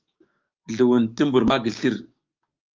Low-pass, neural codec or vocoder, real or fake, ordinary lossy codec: 7.2 kHz; codec, 16 kHz, 6 kbps, DAC; fake; Opus, 32 kbps